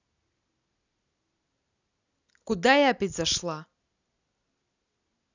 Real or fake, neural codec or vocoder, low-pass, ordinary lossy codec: real; none; 7.2 kHz; none